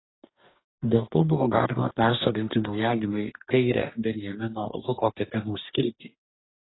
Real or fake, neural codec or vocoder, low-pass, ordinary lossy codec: fake; codec, 44.1 kHz, 2.6 kbps, DAC; 7.2 kHz; AAC, 16 kbps